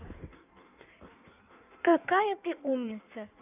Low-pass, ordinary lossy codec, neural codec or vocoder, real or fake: 3.6 kHz; none; codec, 16 kHz in and 24 kHz out, 1.1 kbps, FireRedTTS-2 codec; fake